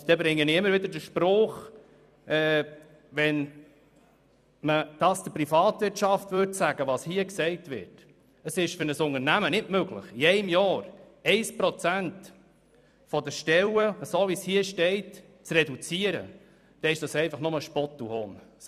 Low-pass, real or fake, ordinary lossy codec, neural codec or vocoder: 14.4 kHz; fake; none; vocoder, 44.1 kHz, 128 mel bands every 256 samples, BigVGAN v2